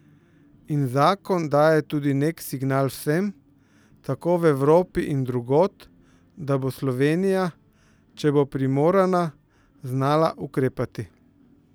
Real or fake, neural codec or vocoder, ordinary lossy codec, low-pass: real; none; none; none